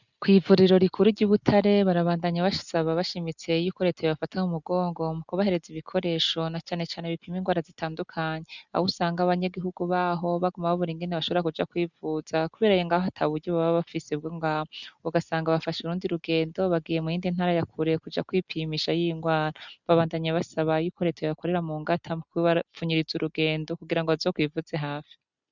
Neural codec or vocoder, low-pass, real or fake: none; 7.2 kHz; real